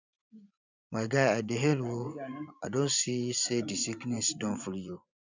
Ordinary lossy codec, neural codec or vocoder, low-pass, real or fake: none; vocoder, 24 kHz, 100 mel bands, Vocos; 7.2 kHz; fake